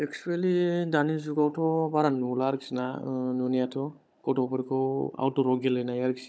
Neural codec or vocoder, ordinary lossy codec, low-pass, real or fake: codec, 16 kHz, 16 kbps, FunCodec, trained on Chinese and English, 50 frames a second; none; none; fake